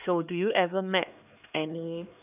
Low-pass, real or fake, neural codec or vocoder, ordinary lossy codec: 3.6 kHz; fake; codec, 16 kHz, 2 kbps, X-Codec, HuBERT features, trained on LibriSpeech; none